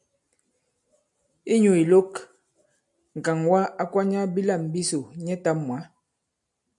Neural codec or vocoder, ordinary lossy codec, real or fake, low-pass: none; MP3, 96 kbps; real; 10.8 kHz